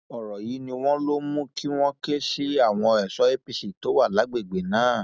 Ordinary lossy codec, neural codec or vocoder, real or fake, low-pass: none; none; real; none